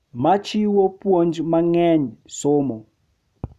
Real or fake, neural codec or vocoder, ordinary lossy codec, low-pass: real; none; none; 14.4 kHz